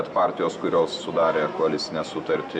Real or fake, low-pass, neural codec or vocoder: real; 9.9 kHz; none